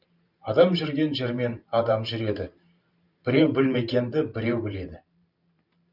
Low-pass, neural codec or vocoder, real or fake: 5.4 kHz; vocoder, 44.1 kHz, 128 mel bands every 512 samples, BigVGAN v2; fake